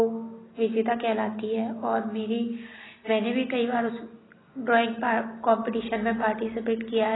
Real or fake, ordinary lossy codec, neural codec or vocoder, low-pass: real; AAC, 16 kbps; none; 7.2 kHz